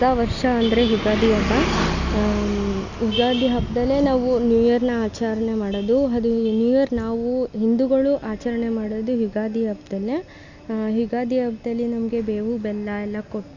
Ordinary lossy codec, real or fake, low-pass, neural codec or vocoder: Opus, 64 kbps; real; 7.2 kHz; none